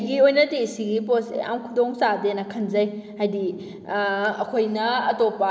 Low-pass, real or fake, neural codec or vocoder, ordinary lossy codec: none; real; none; none